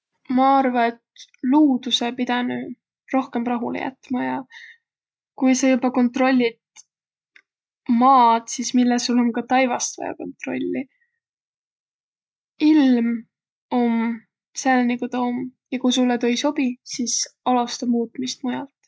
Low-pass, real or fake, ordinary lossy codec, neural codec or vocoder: none; real; none; none